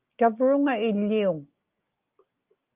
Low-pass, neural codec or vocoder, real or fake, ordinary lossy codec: 3.6 kHz; none; real; Opus, 24 kbps